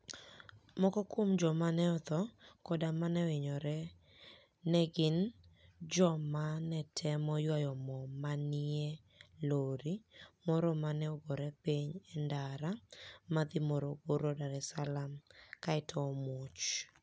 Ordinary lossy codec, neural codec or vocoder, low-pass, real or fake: none; none; none; real